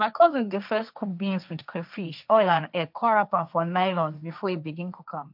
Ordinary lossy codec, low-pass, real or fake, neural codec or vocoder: none; 5.4 kHz; fake; codec, 16 kHz, 1.1 kbps, Voila-Tokenizer